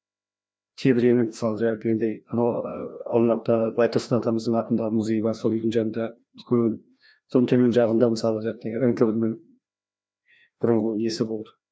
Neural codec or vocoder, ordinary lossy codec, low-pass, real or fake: codec, 16 kHz, 1 kbps, FreqCodec, larger model; none; none; fake